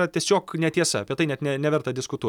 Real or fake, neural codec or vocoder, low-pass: real; none; 19.8 kHz